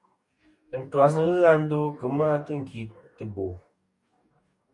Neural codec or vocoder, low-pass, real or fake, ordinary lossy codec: codec, 44.1 kHz, 2.6 kbps, DAC; 10.8 kHz; fake; MP3, 64 kbps